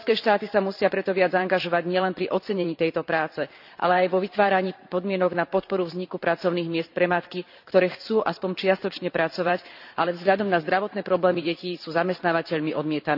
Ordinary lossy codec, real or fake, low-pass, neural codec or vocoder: none; fake; 5.4 kHz; vocoder, 44.1 kHz, 128 mel bands every 512 samples, BigVGAN v2